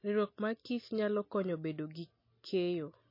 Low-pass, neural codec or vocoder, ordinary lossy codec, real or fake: 5.4 kHz; none; MP3, 24 kbps; real